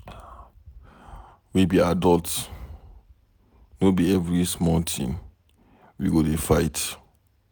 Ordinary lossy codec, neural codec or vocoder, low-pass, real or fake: none; none; none; real